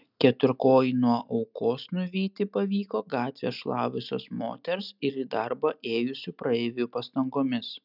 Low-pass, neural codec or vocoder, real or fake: 5.4 kHz; none; real